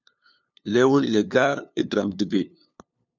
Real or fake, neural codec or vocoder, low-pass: fake; codec, 16 kHz, 2 kbps, FunCodec, trained on LibriTTS, 25 frames a second; 7.2 kHz